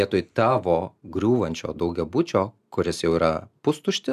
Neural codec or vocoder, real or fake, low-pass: none; real; 14.4 kHz